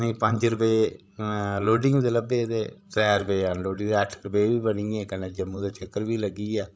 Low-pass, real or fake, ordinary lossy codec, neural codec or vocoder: none; fake; none; codec, 16 kHz, 16 kbps, FreqCodec, larger model